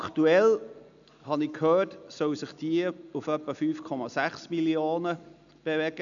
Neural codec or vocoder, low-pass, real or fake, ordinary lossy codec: none; 7.2 kHz; real; none